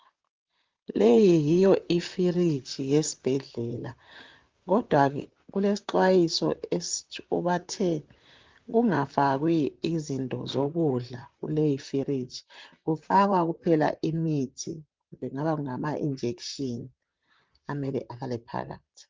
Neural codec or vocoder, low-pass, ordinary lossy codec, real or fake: vocoder, 44.1 kHz, 128 mel bands, Pupu-Vocoder; 7.2 kHz; Opus, 32 kbps; fake